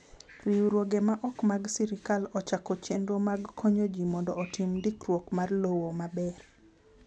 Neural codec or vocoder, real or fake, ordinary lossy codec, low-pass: none; real; none; none